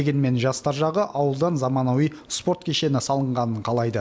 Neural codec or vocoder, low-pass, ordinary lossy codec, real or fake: none; none; none; real